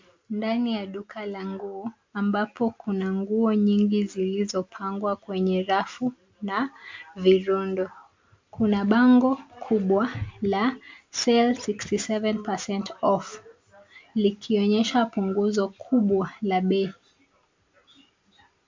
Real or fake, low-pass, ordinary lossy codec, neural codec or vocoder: real; 7.2 kHz; MP3, 48 kbps; none